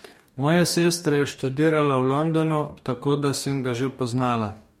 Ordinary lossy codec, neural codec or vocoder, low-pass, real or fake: MP3, 64 kbps; codec, 44.1 kHz, 2.6 kbps, DAC; 19.8 kHz; fake